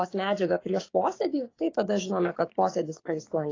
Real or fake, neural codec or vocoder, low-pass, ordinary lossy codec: fake; codec, 16 kHz, 6 kbps, DAC; 7.2 kHz; AAC, 32 kbps